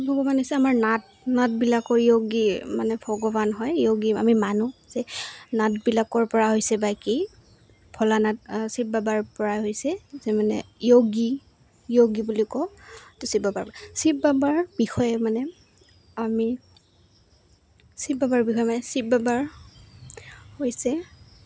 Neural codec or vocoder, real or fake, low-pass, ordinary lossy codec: none; real; none; none